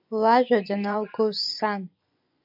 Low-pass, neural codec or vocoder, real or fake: 5.4 kHz; vocoder, 24 kHz, 100 mel bands, Vocos; fake